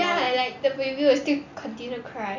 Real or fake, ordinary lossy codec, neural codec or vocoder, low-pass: real; none; none; 7.2 kHz